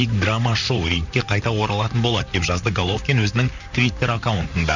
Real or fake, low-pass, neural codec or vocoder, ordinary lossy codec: fake; 7.2 kHz; vocoder, 44.1 kHz, 128 mel bands, Pupu-Vocoder; none